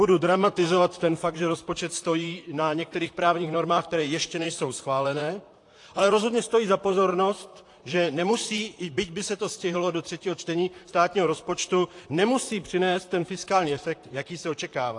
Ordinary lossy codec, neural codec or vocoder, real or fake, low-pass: AAC, 48 kbps; vocoder, 44.1 kHz, 128 mel bands, Pupu-Vocoder; fake; 10.8 kHz